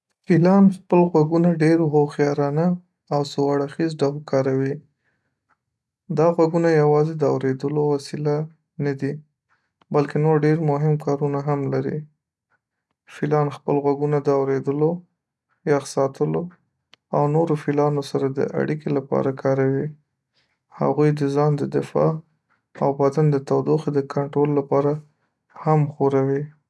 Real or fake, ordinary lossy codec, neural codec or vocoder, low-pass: real; none; none; none